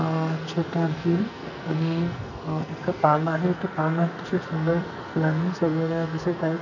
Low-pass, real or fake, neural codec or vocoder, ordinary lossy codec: 7.2 kHz; fake; codec, 44.1 kHz, 2.6 kbps, SNAC; none